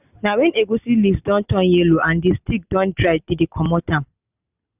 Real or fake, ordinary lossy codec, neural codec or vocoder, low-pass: real; none; none; 3.6 kHz